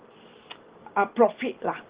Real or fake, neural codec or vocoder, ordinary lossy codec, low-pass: real; none; Opus, 16 kbps; 3.6 kHz